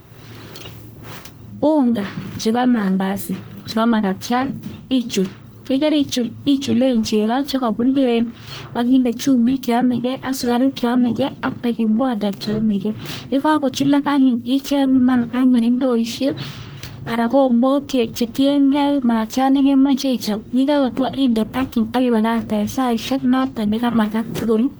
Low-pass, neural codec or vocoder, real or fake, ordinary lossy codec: none; codec, 44.1 kHz, 1.7 kbps, Pupu-Codec; fake; none